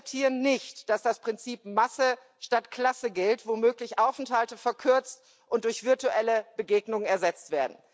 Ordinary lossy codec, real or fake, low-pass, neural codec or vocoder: none; real; none; none